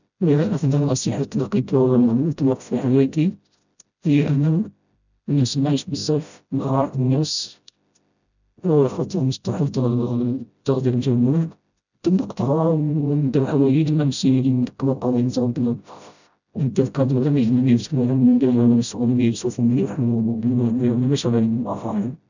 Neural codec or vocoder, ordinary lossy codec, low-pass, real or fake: codec, 16 kHz, 0.5 kbps, FreqCodec, smaller model; none; 7.2 kHz; fake